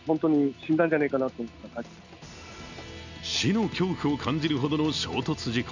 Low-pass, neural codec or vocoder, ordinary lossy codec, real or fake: 7.2 kHz; none; none; real